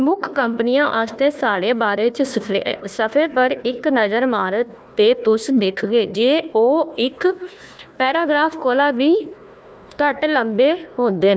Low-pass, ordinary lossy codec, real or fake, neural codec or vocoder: none; none; fake; codec, 16 kHz, 1 kbps, FunCodec, trained on Chinese and English, 50 frames a second